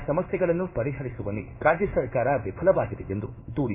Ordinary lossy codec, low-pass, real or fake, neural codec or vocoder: none; 3.6 kHz; fake; codec, 16 kHz in and 24 kHz out, 1 kbps, XY-Tokenizer